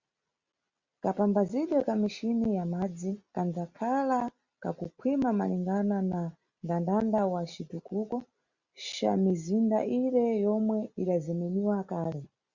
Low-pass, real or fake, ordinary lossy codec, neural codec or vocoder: 7.2 kHz; real; Opus, 64 kbps; none